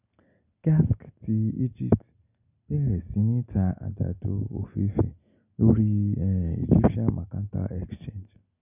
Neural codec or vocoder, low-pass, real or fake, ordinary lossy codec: none; 3.6 kHz; real; none